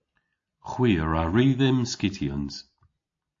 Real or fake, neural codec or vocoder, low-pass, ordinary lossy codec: real; none; 7.2 kHz; AAC, 48 kbps